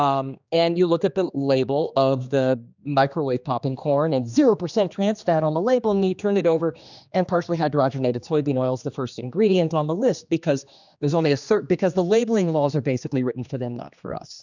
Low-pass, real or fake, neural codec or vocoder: 7.2 kHz; fake; codec, 16 kHz, 2 kbps, X-Codec, HuBERT features, trained on general audio